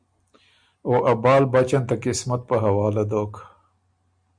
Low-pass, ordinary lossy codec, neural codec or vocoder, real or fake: 9.9 kHz; MP3, 96 kbps; none; real